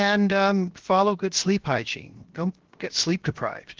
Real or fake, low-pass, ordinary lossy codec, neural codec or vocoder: fake; 7.2 kHz; Opus, 16 kbps; codec, 16 kHz, 0.7 kbps, FocalCodec